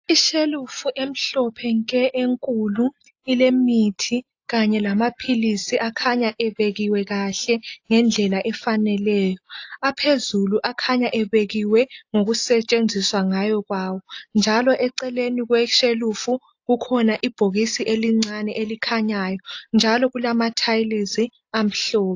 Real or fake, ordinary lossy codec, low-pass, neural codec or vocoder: real; AAC, 48 kbps; 7.2 kHz; none